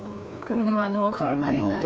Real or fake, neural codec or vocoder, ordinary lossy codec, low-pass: fake; codec, 16 kHz, 2 kbps, FreqCodec, larger model; none; none